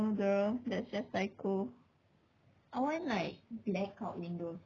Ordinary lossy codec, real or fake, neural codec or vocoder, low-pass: none; real; none; 7.2 kHz